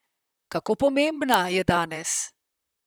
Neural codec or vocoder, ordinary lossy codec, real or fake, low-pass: vocoder, 44.1 kHz, 128 mel bands, Pupu-Vocoder; none; fake; none